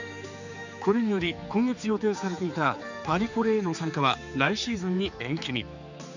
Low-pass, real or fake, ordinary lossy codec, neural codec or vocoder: 7.2 kHz; fake; none; codec, 16 kHz, 4 kbps, X-Codec, HuBERT features, trained on general audio